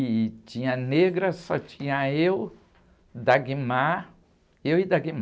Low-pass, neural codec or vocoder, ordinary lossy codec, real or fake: none; none; none; real